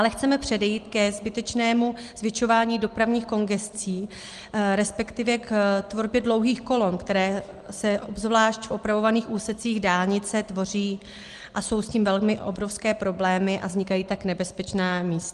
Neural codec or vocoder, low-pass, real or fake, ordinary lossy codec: none; 10.8 kHz; real; Opus, 24 kbps